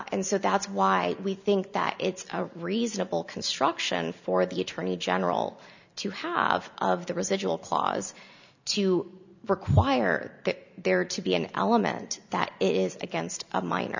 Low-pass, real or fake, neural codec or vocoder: 7.2 kHz; real; none